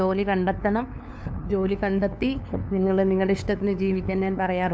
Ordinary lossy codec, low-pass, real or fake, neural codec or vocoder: none; none; fake; codec, 16 kHz, 2 kbps, FunCodec, trained on LibriTTS, 25 frames a second